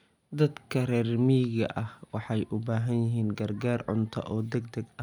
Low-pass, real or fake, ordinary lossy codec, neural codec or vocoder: 19.8 kHz; real; none; none